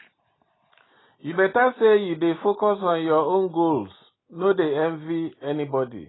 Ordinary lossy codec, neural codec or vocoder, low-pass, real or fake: AAC, 16 kbps; none; 7.2 kHz; real